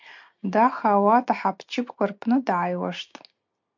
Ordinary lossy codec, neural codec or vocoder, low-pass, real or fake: MP3, 48 kbps; none; 7.2 kHz; real